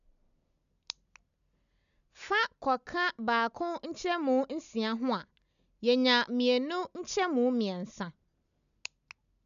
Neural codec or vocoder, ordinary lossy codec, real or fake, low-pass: none; none; real; 7.2 kHz